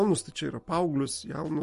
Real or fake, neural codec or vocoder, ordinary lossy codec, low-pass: real; none; MP3, 48 kbps; 14.4 kHz